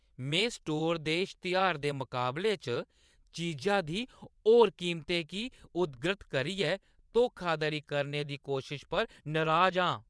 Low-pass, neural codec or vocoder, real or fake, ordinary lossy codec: none; vocoder, 22.05 kHz, 80 mel bands, WaveNeXt; fake; none